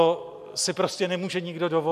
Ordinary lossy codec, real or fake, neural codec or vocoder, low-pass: MP3, 96 kbps; real; none; 14.4 kHz